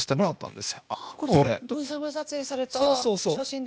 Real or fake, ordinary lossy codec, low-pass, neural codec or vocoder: fake; none; none; codec, 16 kHz, 0.8 kbps, ZipCodec